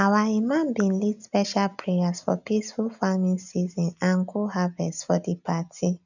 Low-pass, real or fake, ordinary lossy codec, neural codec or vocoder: 7.2 kHz; real; none; none